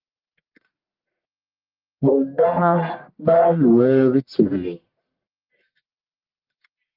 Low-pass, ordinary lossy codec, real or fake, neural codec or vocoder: 5.4 kHz; Opus, 32 kbps; fake; codec, 44.1 kHz, 1.7 kbps, Pupu-Codec